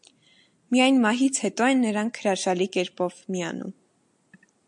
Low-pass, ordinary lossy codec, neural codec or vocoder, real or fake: 10.8 kHz; MP3, 64 kbps; none; real